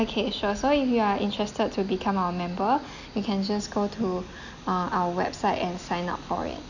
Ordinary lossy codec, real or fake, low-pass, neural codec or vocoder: none; real; 7.2 kHz; none